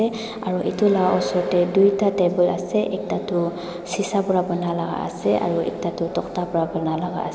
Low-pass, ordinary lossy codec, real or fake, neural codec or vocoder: none; none; real; none